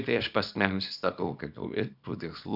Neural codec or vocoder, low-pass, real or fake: codec, 24 kHz, 0.9 kbps, WavTokenizer, small release; 5.4 kHz; fake